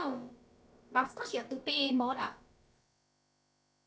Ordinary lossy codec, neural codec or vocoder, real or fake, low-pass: none; codec, 16 kHz, about 1 kbps, DyCAST, with the encoder's durations; fake; none